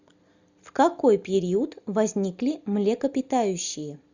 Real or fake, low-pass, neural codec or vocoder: real; 7.2 kHz; none